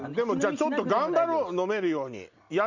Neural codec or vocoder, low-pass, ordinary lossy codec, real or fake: none; 7.2 kHz; Opus, 64 kbps; real